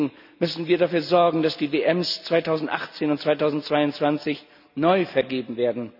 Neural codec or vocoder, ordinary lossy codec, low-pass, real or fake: none; none; 5.4 kHz; real